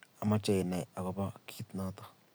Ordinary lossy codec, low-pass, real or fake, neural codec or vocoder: none; none; real; none